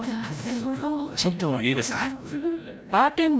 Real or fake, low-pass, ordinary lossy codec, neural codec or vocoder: fake; none; none; codec, 16 kHz, 0.5 kbps, FreqCodec, larger model